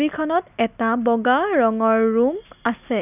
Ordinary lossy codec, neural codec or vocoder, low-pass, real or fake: none; none; 3.6 kHz; real